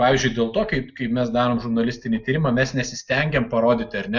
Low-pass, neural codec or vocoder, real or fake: 7.2 kHz; none; real